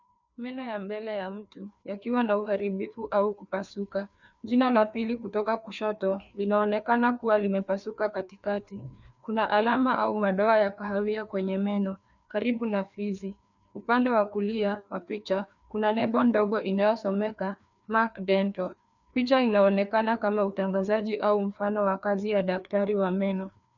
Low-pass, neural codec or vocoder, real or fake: 7.2 kHz; codec, 16 kHz, 2 kbps, FreqCodec, larger model; fake